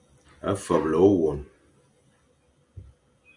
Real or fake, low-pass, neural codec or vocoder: real; 10.8 kHz; none